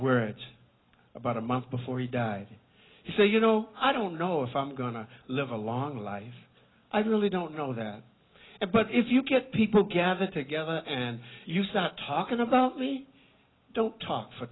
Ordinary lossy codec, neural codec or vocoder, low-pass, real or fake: AAC, 16 kbps; none; 7.2 kHz; real